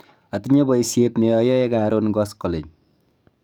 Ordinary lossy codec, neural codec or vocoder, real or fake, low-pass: none; codec, 44.1 kHz, 7.8 kbps, DAC; fake; none